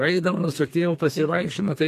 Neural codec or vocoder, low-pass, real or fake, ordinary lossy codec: codec, 32 kHz, 1.9 kbps, SNAC; 14.4 kHz; fake; AAC, 64 kbps